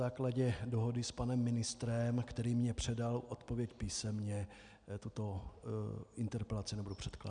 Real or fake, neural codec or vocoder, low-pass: real; none; 9.9 kHz